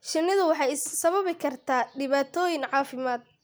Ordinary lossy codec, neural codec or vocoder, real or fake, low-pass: none; none; real; none